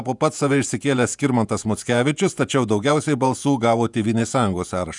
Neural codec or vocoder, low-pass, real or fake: vocoder, 48 kHz, 128 mel bands, Vocos; 10.8 kHz; fake